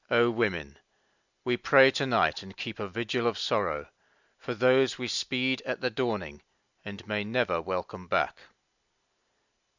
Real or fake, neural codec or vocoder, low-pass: real; none; 7.2 kHz